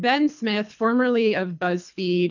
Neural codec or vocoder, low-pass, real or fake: codec, 24 kHz, 3 kbps, HILCodec; 7.2 kHz; fake